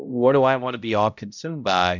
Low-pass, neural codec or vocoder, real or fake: 7.2 kHz; codec, 16 kHz, 0.5 kbps, X-Codec, HuBERT features, trained on balanced general audio; fake